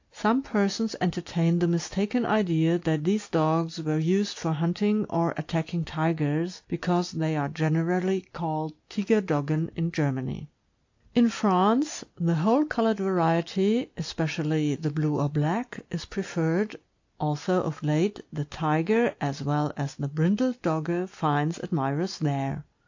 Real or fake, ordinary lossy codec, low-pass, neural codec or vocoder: real; AAC, 48 kbps; 7.2 kHz; none